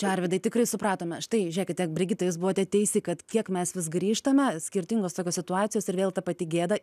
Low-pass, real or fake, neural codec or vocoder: 14.4 kHz; real; none